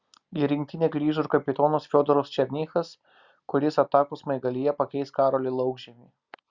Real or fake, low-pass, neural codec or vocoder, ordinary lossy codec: real; 7.2 kHz; none; Opus, 64 kbps